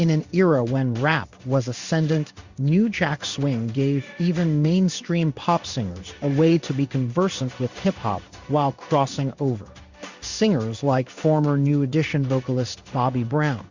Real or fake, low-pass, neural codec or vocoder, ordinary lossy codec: fake; 7.2 kHz; codec, 16 kHz in and 24 kHz out, 1 kbps, XY-Tokenizer; Opus, 64 kbps